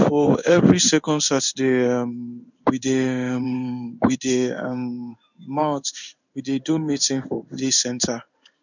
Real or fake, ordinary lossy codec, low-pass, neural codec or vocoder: fake; none; 7.2 kHz; codec, 16 kHz in and 24 kHz out, 1 kbps, XY-Tokenizer